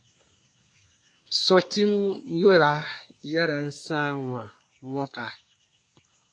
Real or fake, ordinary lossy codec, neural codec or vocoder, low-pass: fake; AAC, 48 kbps; codec, 24 kHz, 1 kbps, SNAC; 9.9 kHz